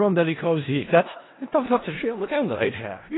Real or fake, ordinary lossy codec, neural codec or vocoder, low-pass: fake; AAC, 16 kbps; codec, 16 kHz in and 24 kHz out, 0.4 kbps, LongCat-Audio-Codec, four codebook decoder; 7.2 kHz